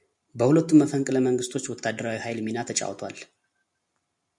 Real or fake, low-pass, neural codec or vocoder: real; 10.8 kHz; none